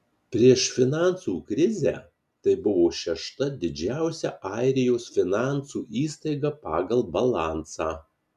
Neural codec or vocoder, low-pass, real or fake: none; 14.4 kHz; real